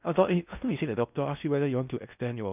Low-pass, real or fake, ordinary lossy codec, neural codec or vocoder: 3.6 kHz; fake; none; codec, 16 kHz in and 24 kHz out, 0.6 kbps, FocalCodec, streaming, 4096 codes